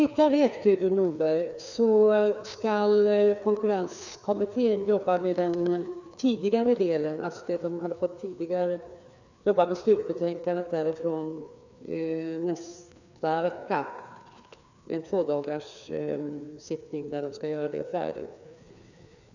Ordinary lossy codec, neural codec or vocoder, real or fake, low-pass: none; codec, 16 kHz, 2 kbps, FreqCodec, larger model; fake; 7.2 kHz